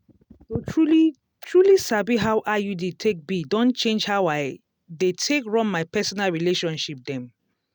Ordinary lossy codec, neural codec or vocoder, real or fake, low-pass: none; none; real; 19.8 kHz